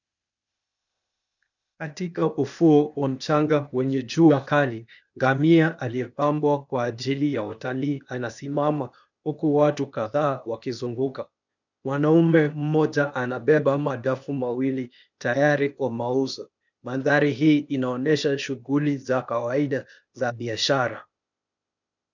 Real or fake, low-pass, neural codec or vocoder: fake; 7.2 kHz; codec, 16 kHz, 0.8 kbps, ZipCodec